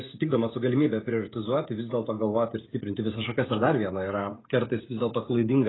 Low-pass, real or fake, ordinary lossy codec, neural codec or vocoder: 7.2 kHz; fake; AAC, 16 kbps; codec, 16 kHz, 16 kbps, FreqCodec, smaller model